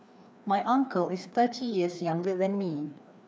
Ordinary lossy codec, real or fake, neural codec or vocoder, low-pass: none; fake; codec, 16 kHz, 2 kbps, FreqCodec, larger model; none